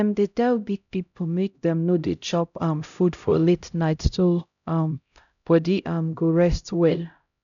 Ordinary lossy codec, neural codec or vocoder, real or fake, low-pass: none; codec, 16 kHz, 0.5 kbps, X-Codec, HuBERT features, trained on LibriSpeech; fake; 7.2 kHz